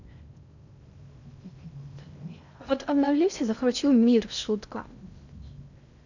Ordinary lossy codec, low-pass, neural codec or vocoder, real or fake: none; 7.2 kHz; codec, 16 kHz in and 24 kHz out, 0.6 kbps, FocalCodec, streaming, 2048 codes; fake